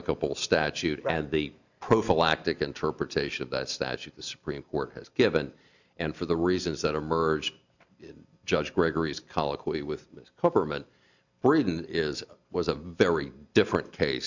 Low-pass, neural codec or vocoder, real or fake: 7.2 kHz; none; real